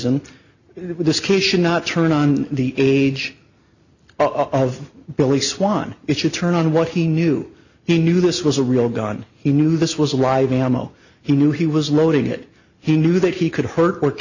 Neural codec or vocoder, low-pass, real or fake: none; 7.2 kHz; real